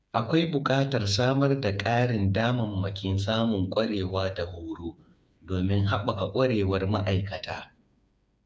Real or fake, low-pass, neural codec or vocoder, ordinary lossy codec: fake; none; codec, 16 kHz, 4 kbps, FreqCodec, smaller model; none